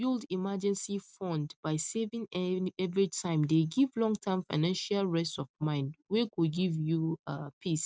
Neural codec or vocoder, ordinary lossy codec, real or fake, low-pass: none; none; real; none